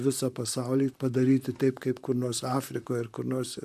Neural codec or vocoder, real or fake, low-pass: vocoder, 44.1 kHz, 128 mel bands, Pupu-Vocoder; fake; 14.4 kHz